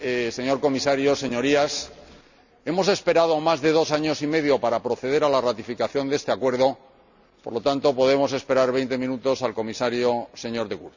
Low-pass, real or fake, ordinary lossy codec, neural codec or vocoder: 7.2 kHz; real; none; none